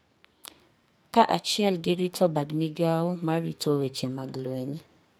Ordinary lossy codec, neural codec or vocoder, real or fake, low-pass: none; codec, 44.1 kHz, 2.6 kbps, SNAC; fake; none